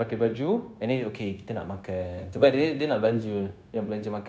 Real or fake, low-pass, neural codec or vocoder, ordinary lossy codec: fake; none; codec, 16 kHz, 0.9 kbps, LongCat-Audio-Codec; none